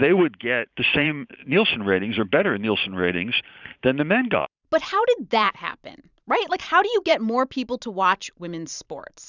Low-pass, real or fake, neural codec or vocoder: 7.2 kHz; fake; vocoder, 44.1 kHz, 128 mel bands every 512 samples, BigVGAN v2